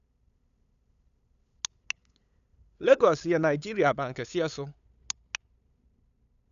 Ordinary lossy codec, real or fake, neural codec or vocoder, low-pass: none; fake; codec, 16 kHz, 8 kbps, FunCodec, trained on LibriTTS, 25 frames a second; 7.2 kHz